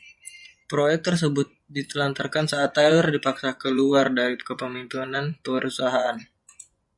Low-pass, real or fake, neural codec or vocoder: 10.8 kHz; fake; vocoder, 24 kHz, 100 mel bands, Vocos